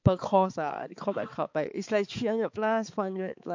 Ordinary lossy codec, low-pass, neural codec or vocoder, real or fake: MP3, 48 kbps; 7.2 kHz; codec, 16 kHz, 4 kbps, X-Codec, HuBERT features, trained on balanced general audio; fake